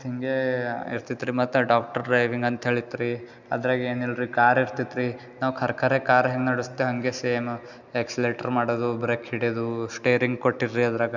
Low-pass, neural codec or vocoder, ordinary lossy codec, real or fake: 7.2 kHz; none; none; real